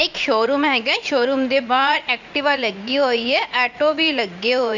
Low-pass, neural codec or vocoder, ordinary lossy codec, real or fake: 7.2 kHz; vocoder, 44.1 kHz, 80 mel bands, Vocos; none; fake